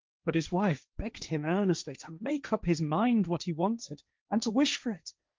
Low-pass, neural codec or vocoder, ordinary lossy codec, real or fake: 7.2 kHz; codec, 16 kHz, 1.1 kbps, Voila-Tokenizer; Opus, 32 kbps; fake